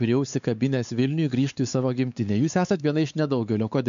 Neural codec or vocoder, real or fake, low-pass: codec, 16 kHz, 4 kbps, X-Codec, WavLM features, trained on Multilingual LibriSpeech; fake; 7.2 kHz